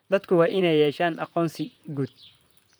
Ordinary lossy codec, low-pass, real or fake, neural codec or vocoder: none; none; fake; vocoder, 44.1 kHz, 128 mel bands, Pupu-Vocoder